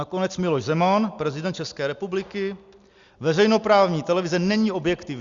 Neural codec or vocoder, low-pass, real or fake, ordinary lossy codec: none; 7.2 kHz; real; Opus, 64 kbps